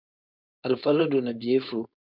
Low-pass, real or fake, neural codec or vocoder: 5.4 kHz; fake; codec, 16 kHz, 4.8 kbps, FACodec